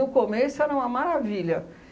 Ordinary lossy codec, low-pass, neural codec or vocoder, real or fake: none; none; none; real